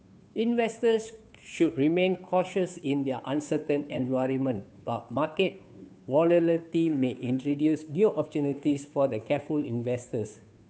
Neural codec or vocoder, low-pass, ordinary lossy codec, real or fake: codec, 16 kHz, 2 kbps, FunCodec, trained on Chinese and English, 25 frames a second; none; none; fake